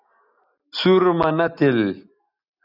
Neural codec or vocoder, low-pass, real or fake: none; 5.4 kHz; real